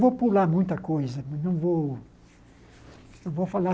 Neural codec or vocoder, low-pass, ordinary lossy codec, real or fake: none; none; none; real